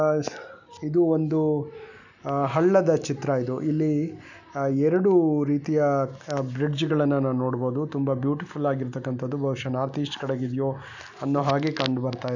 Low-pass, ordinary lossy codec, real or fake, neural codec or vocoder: 7.2 kHz; none; real; none